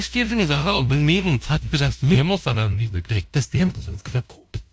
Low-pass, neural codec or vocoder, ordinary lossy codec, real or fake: none; codec, 16 kHz, 0.5 kbps, FunCodec, trained on LibriTTS, 25 frames a second; none; fake